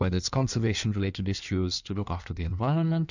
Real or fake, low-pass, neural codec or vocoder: fake; 7.2 kHz; codec, 16 kHz in and 24 kHz out, 1.1 kbps, FireRedTTS-2 codec